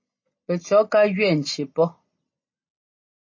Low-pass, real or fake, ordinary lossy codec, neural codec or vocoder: 7.2 kHz; real; MP3, 32 kbps; none